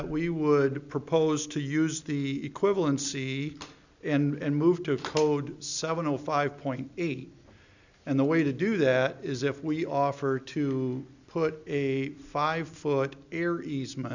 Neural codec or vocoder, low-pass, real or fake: none; 7.2 kHz; real